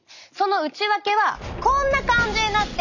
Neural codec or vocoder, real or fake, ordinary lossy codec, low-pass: none; real; none; 7.2 kHz